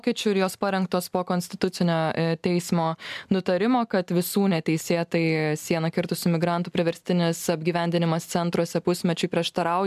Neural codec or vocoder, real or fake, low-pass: none; real; 14.4 kHz